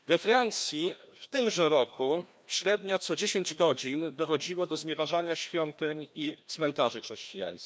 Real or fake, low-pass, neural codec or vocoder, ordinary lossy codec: fake; none; codec, 16 kHz, 1 kbps, FreqCodec, larger model; none